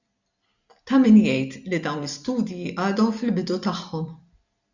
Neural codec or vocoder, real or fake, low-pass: none; real; 7.2 kHz